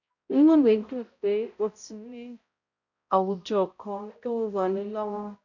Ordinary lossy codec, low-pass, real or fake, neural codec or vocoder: none; 7.2 kHz; fake; codec, 16 kHz, 0.5 kbps, X-Codec, HuBERT features, trained on balanced general audio